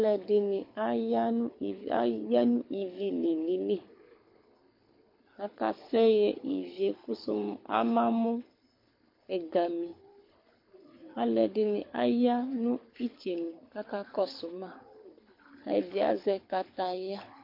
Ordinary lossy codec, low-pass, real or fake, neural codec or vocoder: MP3, 32 kbps; 5.4 kHz; fake; codec, 24 kHz, 6 kbps, HILCodec